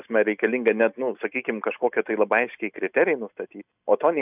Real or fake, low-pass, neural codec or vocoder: real; 3.6 kHz; none